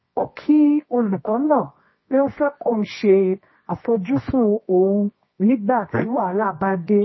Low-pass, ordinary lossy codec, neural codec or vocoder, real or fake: 7.2 kHz; MP3, 24 kbps; codec, 24 kHz, 0.9 kbps, WavTokenizer, medium music audio release; fake